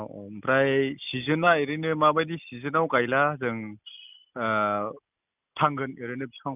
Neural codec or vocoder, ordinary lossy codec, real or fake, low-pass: none; none; real; 3.6 kHz